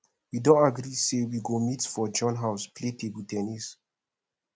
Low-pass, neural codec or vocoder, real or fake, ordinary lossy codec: none; none; real; none